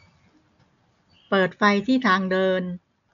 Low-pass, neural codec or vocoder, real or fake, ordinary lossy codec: 7.2 kHz; none; real; none